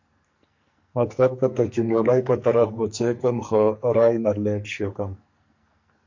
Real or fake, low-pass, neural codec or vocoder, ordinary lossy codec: fake; 7.2 kHz; codec, 44.1 kHz, 2.6 kbps, SNAC; MP3, 48 kbps